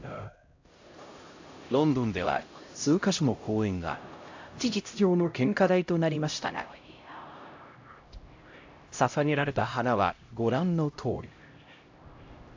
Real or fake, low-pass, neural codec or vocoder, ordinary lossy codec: fake; 7.2 kHz; codec, 16 kHz, 0.5 kbps, X-Codec, HuBERT features, trained on LibriSpeech; AAC, 48 kbps